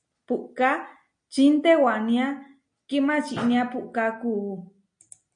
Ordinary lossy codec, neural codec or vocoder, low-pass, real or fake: MP3, 48 kbps; none; 9.9 kHz; real